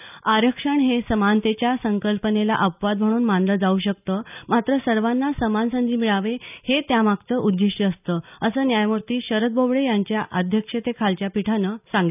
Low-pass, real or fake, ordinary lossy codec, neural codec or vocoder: 3.6 kHz; real; none; none